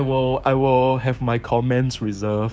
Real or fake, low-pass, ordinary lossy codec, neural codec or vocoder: fake; none; none; codec, 16 kHz, 6 kbps, DAC